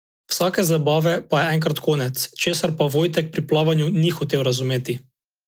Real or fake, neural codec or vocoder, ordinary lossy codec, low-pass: real; none; Opus, 24 kbps; 19.8 kHz